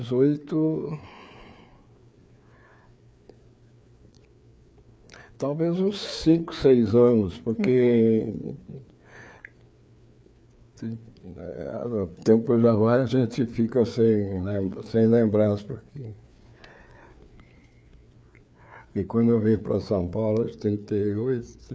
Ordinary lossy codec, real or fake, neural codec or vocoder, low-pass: none; fake; codec, 16 kHz, 4 kbps, FreqCodec, larger model; none